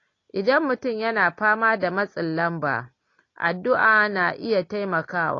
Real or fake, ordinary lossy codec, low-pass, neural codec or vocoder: real; AAC, 32 kbps; 7.2 kHz; none